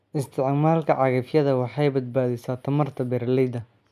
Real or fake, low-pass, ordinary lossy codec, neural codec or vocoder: real; 14.4 kHz; none; none